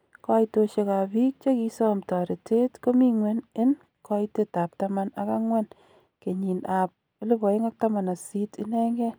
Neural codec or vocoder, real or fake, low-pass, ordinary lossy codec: none; real; none; none